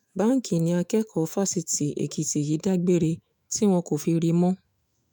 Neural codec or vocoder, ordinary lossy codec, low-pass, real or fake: autoencoder, 48 kHz, 128 numbers a frame, DAC-VAE, trained on Japanese speech; none; none; fake